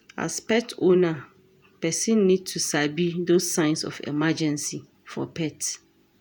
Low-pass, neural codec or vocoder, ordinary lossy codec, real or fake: none; vocoder, 48 kHz, 128 mel bands, Vocos; none; fake